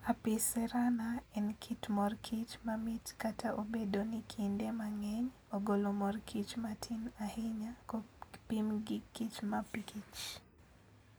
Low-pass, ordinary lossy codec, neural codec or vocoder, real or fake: none; none; none; real